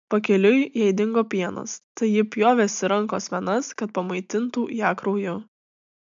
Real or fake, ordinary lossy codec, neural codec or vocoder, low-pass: real; MP3, 64 kbps; none; 7.2 kHz